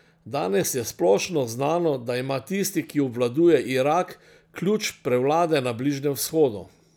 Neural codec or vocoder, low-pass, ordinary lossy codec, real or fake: none; none; none; real